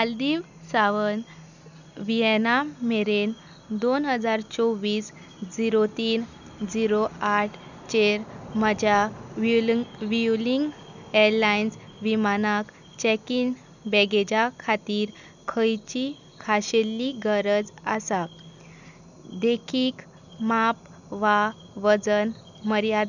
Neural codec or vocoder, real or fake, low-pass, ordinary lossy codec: none; real; 7.2 kHz; none